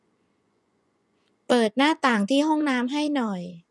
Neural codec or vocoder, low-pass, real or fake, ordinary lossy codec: vocoder, 24 kHz, 100 mel bands, Vocos; none; fake; none